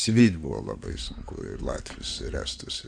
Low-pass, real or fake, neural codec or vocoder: 9.9 kHz; fake; codec, 16 kHz in and 24 kHz out, 2.2 kbps, FireRedTTS-2 codec